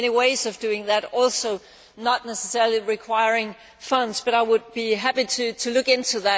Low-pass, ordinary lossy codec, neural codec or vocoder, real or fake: none; none; none; real